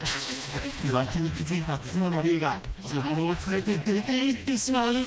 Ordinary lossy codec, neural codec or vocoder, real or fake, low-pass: none; codec, 16 kHz, 1 kbps, FreqCodec, smaller model; fake; none